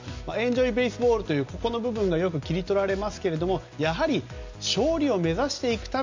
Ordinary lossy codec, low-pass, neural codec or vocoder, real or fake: MP3, 64 kbps; 7.2 kHz; none; real